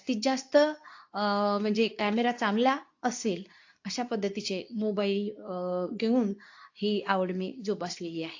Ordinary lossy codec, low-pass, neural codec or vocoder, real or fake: AAC, 48 kbps; 7.2 kHz; codec, 24 kHz, 0.9 kbps, WavTokenizer, medium speech release version 2; fake